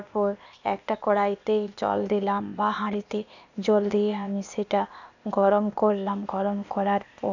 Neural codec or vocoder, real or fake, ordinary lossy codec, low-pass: codec, 16 kHz, 0.8 kbps, ZipCodec; fake; none; 7.2 kHz